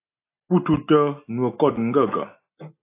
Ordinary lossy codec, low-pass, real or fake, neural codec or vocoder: AAC, 24 kbps; 3.6 kHz; real; none